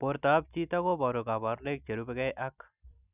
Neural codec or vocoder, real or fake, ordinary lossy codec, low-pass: none; real; none; 3.6 kHz